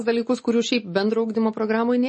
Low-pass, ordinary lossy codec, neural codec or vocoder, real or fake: 10.8 kHz; MP3, 32 kbps; none; real